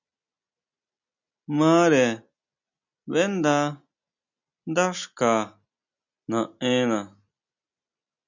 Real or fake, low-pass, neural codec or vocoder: real; 7.2 kHz; none